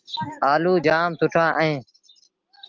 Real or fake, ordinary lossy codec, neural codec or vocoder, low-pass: real; Opus, 24 kbps; none; 7.2 kHz